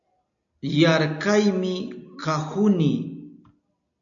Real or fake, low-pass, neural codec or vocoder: real; 7.2 kHz; none